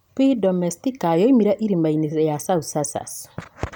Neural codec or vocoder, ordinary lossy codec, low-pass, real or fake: none; none; none; real